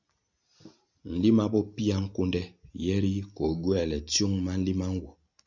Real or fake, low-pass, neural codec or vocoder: real; 7.2 kHz; none